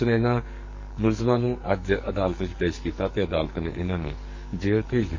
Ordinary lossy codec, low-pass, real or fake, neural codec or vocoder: MP3, 32 kbps; 7.2 kHz; fake; codec, 44.1 kHz, 2.6 kbps, SNAC